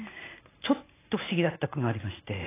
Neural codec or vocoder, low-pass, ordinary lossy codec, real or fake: none; 3.6 kHz; AAC, 16 kbps; real